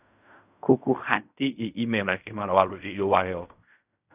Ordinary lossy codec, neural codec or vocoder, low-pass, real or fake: none; codec, 16 kHz in and 24 kHz out, 0.4 kbps, LongCat-Audio-Codec, fine tuned four codebook decoder; 3.6 kHz; fake